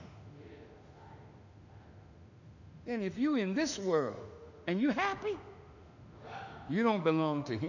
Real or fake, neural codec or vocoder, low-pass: fake; autoencoder, 48 kHz, 32 numbers a frame, DAC-VAE, trained on Japanese speech; 7.2 kHz